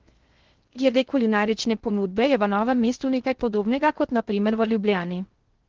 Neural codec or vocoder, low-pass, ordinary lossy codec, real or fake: codec, 16 kHz in and 24 kHz out, 0.6 kbps, FocalCodec, streaming, 2048 codes; 7.2 kHz; Opus, 16 kbps; fake